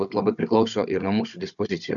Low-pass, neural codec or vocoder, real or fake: 7.2 kHz; codec, 16 kHz, 16 kbps, FunCodec, trained on Chinese and English, 50 frames a second; fake